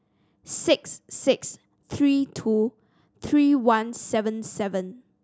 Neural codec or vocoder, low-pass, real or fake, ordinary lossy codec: none; none; real; none